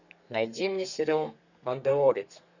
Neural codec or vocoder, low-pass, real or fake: codec, 32 kHz, 1.9 kbps, SNAC; 7.2 kHz; fake